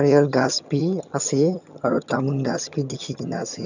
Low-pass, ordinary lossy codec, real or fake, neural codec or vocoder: 7.2 kHz; none; fake; vocoder, 22.05 kHz, 80 mel bands, HiFi-GAN